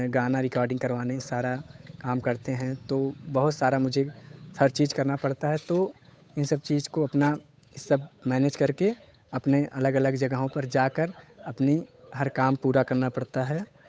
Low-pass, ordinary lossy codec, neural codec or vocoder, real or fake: none; none; codec, 16 kHz, 8 kbps, FunCodec, trained on Chinese and English, 25 frames a second; fake